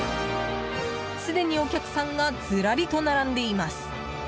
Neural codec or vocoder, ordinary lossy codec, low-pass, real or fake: none; none; none; real